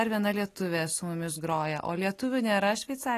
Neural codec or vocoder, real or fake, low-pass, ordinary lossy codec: none; real; 14.4 kHz; AAC, 48 kbps